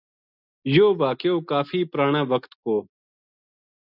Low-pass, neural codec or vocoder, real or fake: 5.4 kHz; none; real